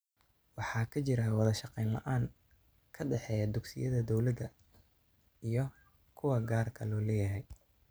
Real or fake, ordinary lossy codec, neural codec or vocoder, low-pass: real; none; none; none